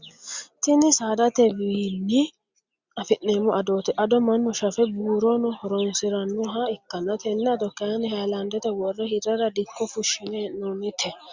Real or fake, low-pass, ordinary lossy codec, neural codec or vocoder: real; 7.2 kHz; Opus, 64 kbps; none